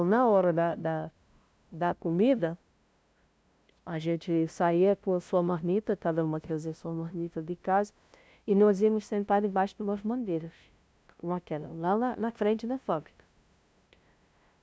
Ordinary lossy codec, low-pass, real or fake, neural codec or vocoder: none; none; fake; codec, 16 kHz, 0.5 kbps, FunCodec, trained on LibriTTS, 25 frames a second